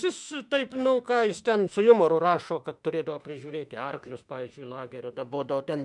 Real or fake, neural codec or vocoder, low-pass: fake; autoencoder, 48 kHz, 32 numbers a frame, DAC-VAE, trained on Japanese speech; 10.8 kHz